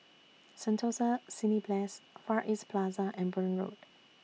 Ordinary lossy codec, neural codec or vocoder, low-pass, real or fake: none; none; none; real